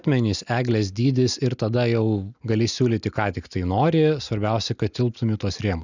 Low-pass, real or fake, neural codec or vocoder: 7.2 kHz; real; none